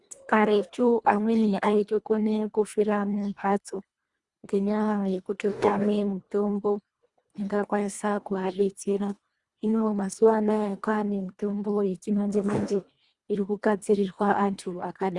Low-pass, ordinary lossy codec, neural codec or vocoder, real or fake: 10.8 kHz; Opus, 64 kbps; codec, 24 kHz, 1.5 kbps, HILCodec; fake